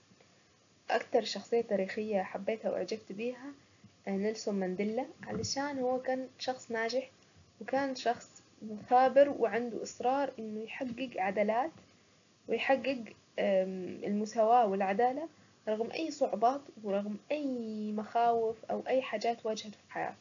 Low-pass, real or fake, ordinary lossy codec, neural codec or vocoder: 7.2 kHz; real; none; none